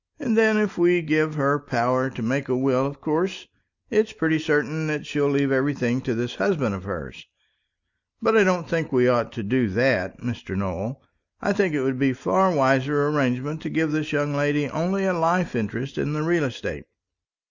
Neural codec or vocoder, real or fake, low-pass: none; real; 7.2 kHz